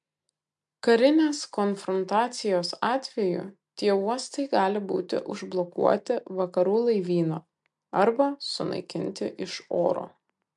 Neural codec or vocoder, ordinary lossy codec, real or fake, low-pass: none; MP3, 64 kbps; real; 10.8 kHz